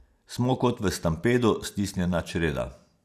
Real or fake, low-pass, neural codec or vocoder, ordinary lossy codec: real; 14.4 kHz; none; none